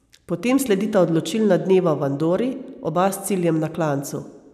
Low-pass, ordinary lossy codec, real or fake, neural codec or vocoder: 14.4 kHz; none; real; none